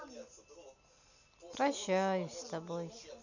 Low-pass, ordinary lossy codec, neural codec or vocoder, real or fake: 7.2 kHz; none; none; real